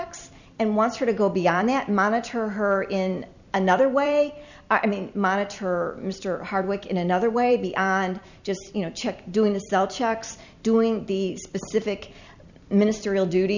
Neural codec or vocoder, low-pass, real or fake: vocoder, 44.1 kHz, 128 mel bands every 256 samples, BigVGAN v2; 7.2 kHz; fake